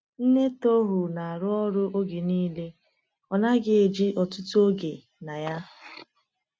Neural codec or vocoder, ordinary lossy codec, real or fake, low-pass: none; none; real; none